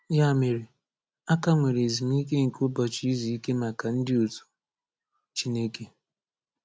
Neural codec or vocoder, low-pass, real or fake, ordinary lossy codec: none; none; real; none